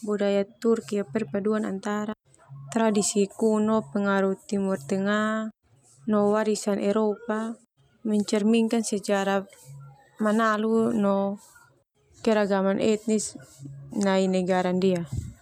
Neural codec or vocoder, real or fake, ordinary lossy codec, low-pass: none; real; none; 19.8 kHz